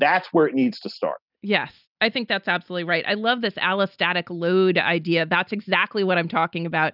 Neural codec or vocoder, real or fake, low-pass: none; real; 5.4 kHz